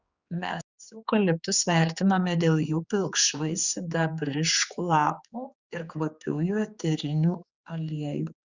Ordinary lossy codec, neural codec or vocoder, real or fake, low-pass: Opus, 64 kbps; codec, 16 kHz, 4 kbps, X-Codec, HuBERT features, trained on general audio; fake; 7.2 kHz